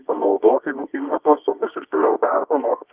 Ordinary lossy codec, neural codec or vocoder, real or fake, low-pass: Opus, 32 kbps; codec, 24 kHz, 0.9 kbps, WavTokenizer, medium music audio release; fake; 3.6 kHz